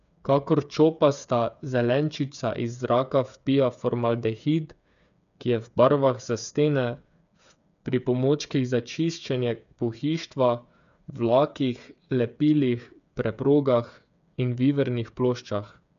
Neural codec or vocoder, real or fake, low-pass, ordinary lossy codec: codec, 16 kHz, 8 kbps, FreqCodec, smaller model; fake; 7.2 kHz; none